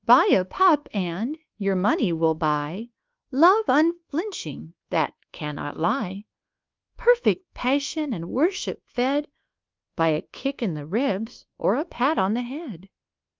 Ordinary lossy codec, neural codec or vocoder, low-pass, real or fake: Opus, 32 kbps; codec, 24 kHz, 1.2 kbps, DualCodec; 7.2 kHz; fake